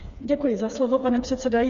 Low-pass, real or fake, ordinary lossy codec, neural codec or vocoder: 7.2 kHz; fake; Opus, 64 kbps; codec, 16 kHz, 4 kbps, FreqCodec, smaller model